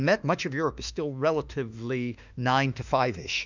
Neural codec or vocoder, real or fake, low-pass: autoencoder, 48 kHz, 32 numbers a frame, DAC-VAE, trained on Japanese speech; fake; 7.2 kHz